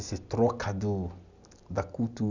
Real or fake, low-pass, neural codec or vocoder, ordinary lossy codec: real; 7.2 kHz; none; none